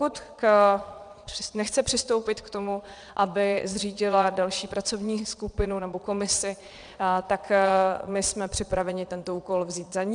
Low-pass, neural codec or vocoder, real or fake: 9.9 kHz; vocoder, 22.05 kHz, 80 mel bands, Vocos; fake